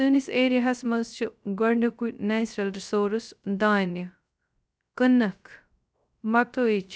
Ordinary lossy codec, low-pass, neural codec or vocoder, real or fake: none; none; codec, 16 kHz, 0.3 kbps, FocalCodec; fake